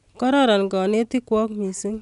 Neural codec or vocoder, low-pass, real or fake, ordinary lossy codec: none; 10.8 kHz; real; none